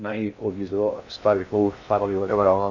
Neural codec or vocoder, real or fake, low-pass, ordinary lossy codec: codec, 16 kHz in and 24 kHz out, 0.6 kbps, FocalCodec, streaming, 2048 codes; fake; 7.2 kHz; none